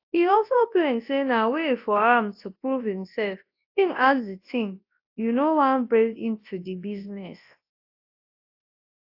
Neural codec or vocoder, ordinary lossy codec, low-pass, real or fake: codec, 24 kHz, 0.9 kbps, WavTokenizer, large speech release; AAC, 32 kbps; 5.4 kHz; fake